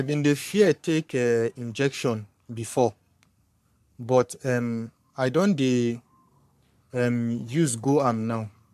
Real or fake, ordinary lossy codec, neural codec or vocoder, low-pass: fake; MP3, 96 kbps; codec, 44.1 kHz, 3.4 kbps, Pupu-Codec; 14.4 kHz